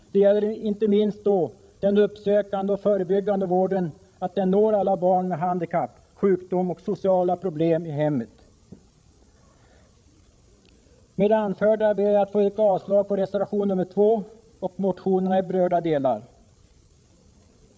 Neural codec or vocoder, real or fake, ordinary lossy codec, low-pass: codec, 16 kHz, 16 kbps, FreqCodec, larger model; fake; none; none